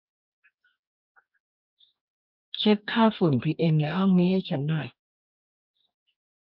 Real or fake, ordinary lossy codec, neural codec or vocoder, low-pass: fake; none; codec, 44.1 kHz, 2.6 kbps, DAC; 5.4 kHz